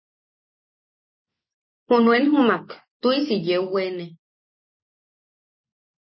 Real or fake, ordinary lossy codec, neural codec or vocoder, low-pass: real; MP3, 24 kbps; none; 7.2 kHz